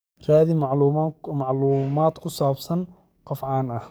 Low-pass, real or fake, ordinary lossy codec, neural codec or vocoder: none; fake; none; codec, 44.1 kHz, 7.8 kbps, DAC